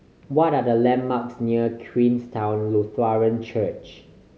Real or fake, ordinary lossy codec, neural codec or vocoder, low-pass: real; none; none; none